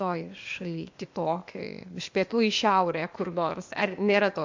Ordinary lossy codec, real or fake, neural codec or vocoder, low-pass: MP3, 48 kbps; fake; codec, 16 kHz, 0.8 kbps, ZipCodec; 7.2 kHz